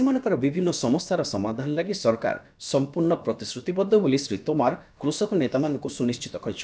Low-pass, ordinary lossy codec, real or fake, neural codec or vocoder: none; none; fake; codec, 16 kHz, about 1 kbps, DyCAST, with the encoder's durations